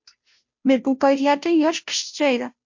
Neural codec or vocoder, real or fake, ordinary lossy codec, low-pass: codec, 16 kHz, 0.5 kbps, FunCodec, trained on Chinese and English, 25 frames a second; fake; MP3, 48 kbps; 7.2 kHz